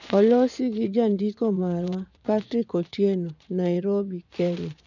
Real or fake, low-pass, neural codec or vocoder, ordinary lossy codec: fake; 7.2 kHz; vocoder, 24 kHz, 100 mel bands, Vocos; none